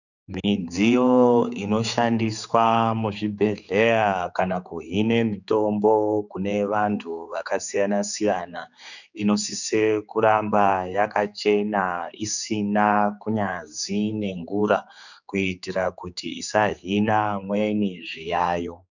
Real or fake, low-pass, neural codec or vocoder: fake; 7.2 kHz; codec, 16 kHz, 4 kbps, X-Codec, HuBERT features, trained on general audio